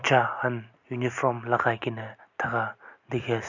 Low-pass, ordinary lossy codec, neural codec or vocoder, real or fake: 7.2 kHz; none; none; real